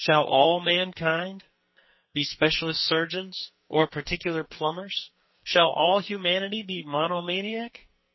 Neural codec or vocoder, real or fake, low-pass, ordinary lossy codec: codec, 44.1 kHz, 2.6 kbps, SNAC; fake; 7.2 kHz; MP3, 24 kbps